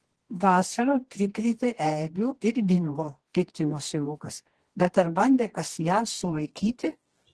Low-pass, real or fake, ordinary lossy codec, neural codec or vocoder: 10.8 kHz; fake; Opus, 16 kbps; codec, 24 kHz, 0.9 kbps, WavTokenizer, medium music audio release